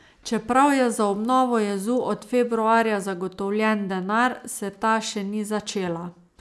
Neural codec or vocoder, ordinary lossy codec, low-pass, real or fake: none; none; none; real